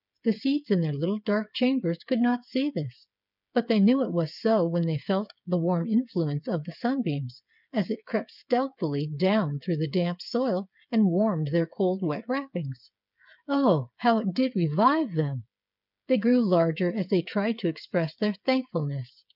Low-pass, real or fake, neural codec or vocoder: 5.4 kHz; fake; codec, 16 kHz, 8 kbps, FreqCodec, smaller model